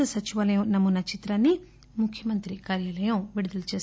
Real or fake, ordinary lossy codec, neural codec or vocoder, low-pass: real; none; none; none